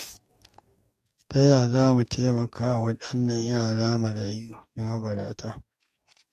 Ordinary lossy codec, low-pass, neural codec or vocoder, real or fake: MP3, 64 kbps; 14.4 kHz; codec, 44.1 kHz, 2.6 kbps, DAC; fake